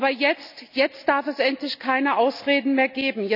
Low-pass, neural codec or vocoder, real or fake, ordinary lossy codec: 5.4 kHz; none; real; none